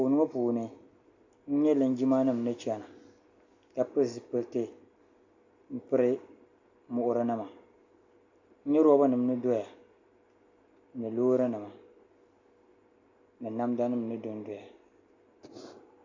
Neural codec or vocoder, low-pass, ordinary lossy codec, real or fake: none; 7.2 kHz; AAC, 48 kbps; real